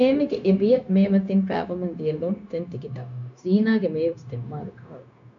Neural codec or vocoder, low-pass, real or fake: codec, 16 kHz, 0.9 kbps, LongCat-Audio-Codec; 7.2 kHz; fake